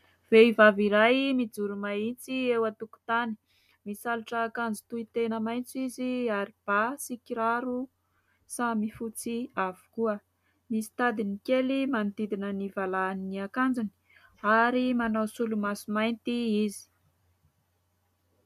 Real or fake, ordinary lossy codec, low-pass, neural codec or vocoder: real; MP3, 96 kbps; 14.4 kHz; none